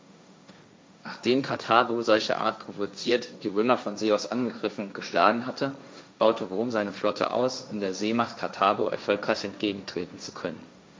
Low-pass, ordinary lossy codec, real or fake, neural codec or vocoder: none; none; fake; codec, 16 kHz, 1.1 kbps, Voila-Tokenizer